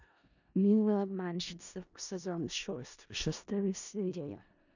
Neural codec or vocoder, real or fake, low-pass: codec, 16 kHz in and 24 kHz out, 0.4 kbps, LongCat-Audio-Codec, four codebook decoder; fake; 7.2 kHz